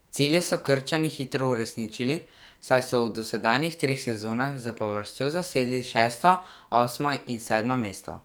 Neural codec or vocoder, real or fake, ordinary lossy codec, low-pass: codec, 44.1 kHz, 2.6 kbps, SNAC; fake; none; none